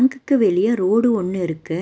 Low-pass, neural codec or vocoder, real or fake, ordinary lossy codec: none; none; real; none